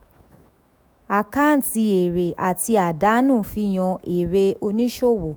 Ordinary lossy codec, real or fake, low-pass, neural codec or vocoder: none; fake; none; autoencoder, 48 kHz, 128 numbers a frame, DAC-VAE, trained on Japanese speech